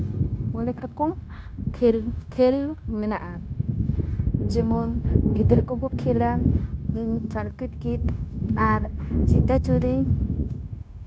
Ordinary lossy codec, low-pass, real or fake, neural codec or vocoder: none; none; fake; codec, 16 kHz, 0.9 kbps, LongCat-Audio-Codec